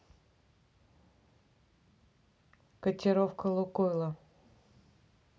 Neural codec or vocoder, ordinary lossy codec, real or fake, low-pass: none; none; real; none